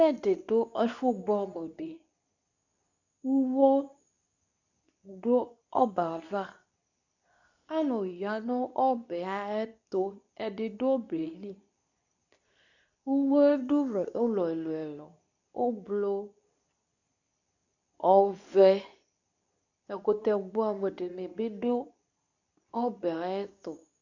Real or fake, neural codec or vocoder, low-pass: fake; codec, 24 kHz, 0.9 kbps, WavTokenizer, medium speech release version 2; 7.2 kHz